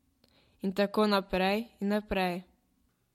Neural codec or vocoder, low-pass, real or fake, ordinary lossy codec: none; 19.8 kHz; real; MP3, 64 kbps